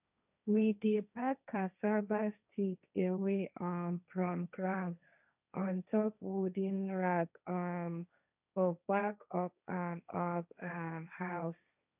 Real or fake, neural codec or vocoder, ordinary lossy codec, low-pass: fake; codec, 16 kHz, 1.1 kbps, Voila-Tokenizer; none; 3.6 kHz